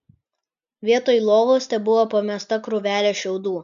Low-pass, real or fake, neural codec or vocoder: 7.2 kHz; real; none